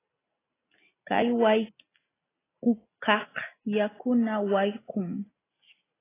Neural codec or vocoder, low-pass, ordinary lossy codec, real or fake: none; 3.6 kHz; AAC, 16 kbps; real